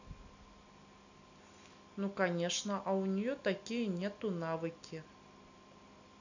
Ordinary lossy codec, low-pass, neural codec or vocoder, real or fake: none; 7.2 kHz; none; real